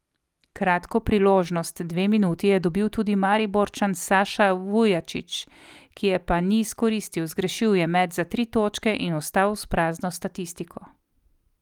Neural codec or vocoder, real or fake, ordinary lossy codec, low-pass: none; real; Opus, 32 kbps; 19.8 kHz